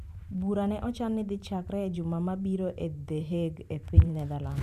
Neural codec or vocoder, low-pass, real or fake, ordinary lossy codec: vocoder, 44.1 kHz, 128 mel bands every 512 samples, BigVGAN v2; 14.4 kHz; fake; none